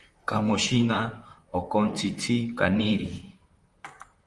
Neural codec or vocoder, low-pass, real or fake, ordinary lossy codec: vocoder, 44.1 kHz, 128 mel bands, Pupu-Vocoder; 10.8 kHz; fake; Opus, 32 kbps